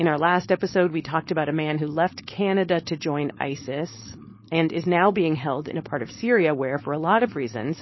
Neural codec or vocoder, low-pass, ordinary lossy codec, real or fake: codec, 16 kHz, 4.8 kbps, FACodec; 7.2 kHz; MP3, 24 kbps; fake